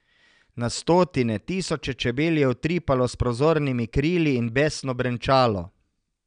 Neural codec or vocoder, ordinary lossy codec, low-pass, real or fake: none; none; 9.9 kHz; real